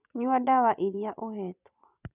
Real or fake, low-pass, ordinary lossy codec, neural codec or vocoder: fake; 3.6 kHz; none; vocoder, 24 kHz, 100 mel bands, Vocos